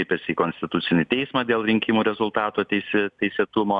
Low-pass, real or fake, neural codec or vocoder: 10.8 kHz; real; none